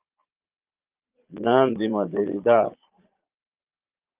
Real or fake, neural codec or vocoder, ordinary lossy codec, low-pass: fake; vocoder, 22.05 kHz, 80 mel bands, Vocos; Opus, 32 kbps; 3.6 kHz